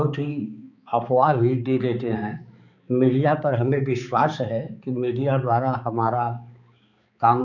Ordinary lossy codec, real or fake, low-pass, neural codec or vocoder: none; fake; 7.2 kHz; codec, 16 kHz, 4 kbps, X-Codec, HuBERT features, trained on balanced general audio